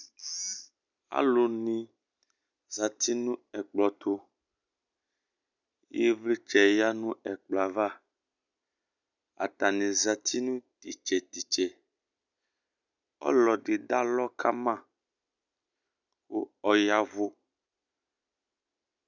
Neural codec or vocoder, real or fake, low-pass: none; real; 7.2 kHz